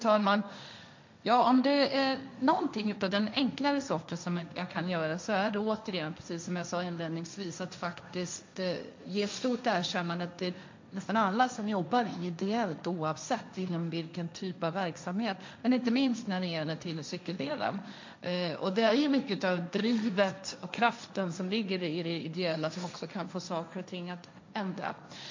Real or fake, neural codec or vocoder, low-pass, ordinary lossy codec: fake; codec, 16 kHz, 1.1 kbps, Voila-Tokenizer; 7.2 kHz; MP3, 64 kbps